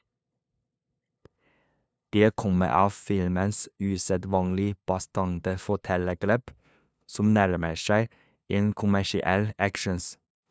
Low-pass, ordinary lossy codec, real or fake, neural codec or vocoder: none; none; fake; codec, 16 kHz, 2 kbps, FunCodec, trained on LibriTTS, 25 frames a second